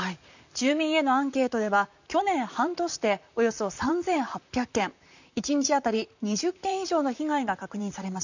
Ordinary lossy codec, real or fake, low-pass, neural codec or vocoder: none; fake; 7.2 kHz; vocoder, 44.1 kHz, 128 mel bands, Pupu-Vocoder